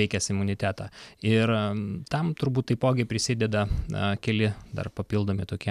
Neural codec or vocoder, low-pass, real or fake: none; 14.4 kHz; real